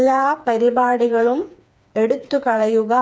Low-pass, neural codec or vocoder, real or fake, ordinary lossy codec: none; codec, 16 kHz, 4 kbps, FreqCodec, smaller model; fake; none